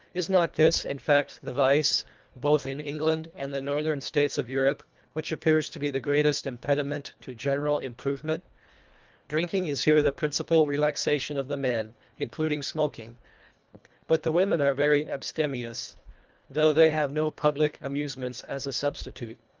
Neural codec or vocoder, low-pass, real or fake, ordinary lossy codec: codec, 24 kHz, 1.5 kbps, HILCodec; 7.2 kHz; fake; Opus, 32 kbps